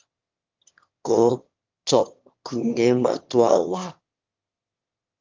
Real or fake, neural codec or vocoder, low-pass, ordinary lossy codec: fake; autoencoder, 22.05 kHz, a latent of 192 numbers a frame, VITS, trained on one speaker; 7.2 kHz; Opus, 32 kbps